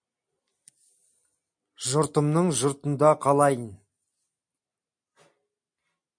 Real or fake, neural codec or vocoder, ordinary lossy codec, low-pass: real; none; MP3, 64 kbps; 9.9 kHz